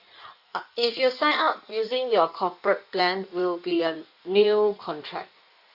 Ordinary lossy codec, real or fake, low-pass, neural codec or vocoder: Opus, 64 kbps; fake; 5.4 kHz; codec, 16 kHz in and 24 kHz out, 1.1 kbps, FireRedTTS-2 codec